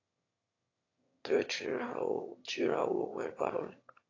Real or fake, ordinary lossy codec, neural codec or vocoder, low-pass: fake; AAC, 32 kbps; autoencoder, 22.05 kHz, a latent of 192 numbers a frame, VITS, trained on one speaker; 7.2 kHz